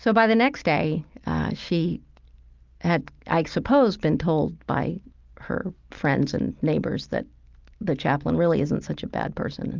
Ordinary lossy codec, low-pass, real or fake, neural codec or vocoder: Opus, 24 kbps; 7.2 kHz; real; none